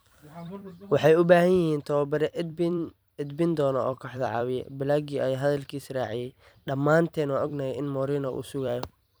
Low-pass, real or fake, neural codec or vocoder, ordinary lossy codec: none; fake; vocoder, 44.1 kHz, 128 mel bands every 256 samples, BigVGAN v2; none